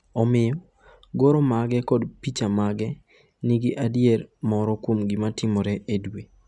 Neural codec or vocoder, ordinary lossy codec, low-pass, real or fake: none; none; 10.8 kHz; real